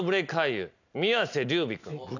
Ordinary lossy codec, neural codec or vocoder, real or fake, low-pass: none; none; real; 7.2 kHz